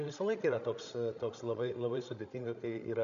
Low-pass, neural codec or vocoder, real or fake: 7.2 kHz; codec, 16 kHz, 16 kbps, FreqCodec, larger model; fake